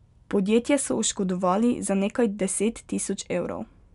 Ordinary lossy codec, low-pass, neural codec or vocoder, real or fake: none; 10.8 kHz; none; real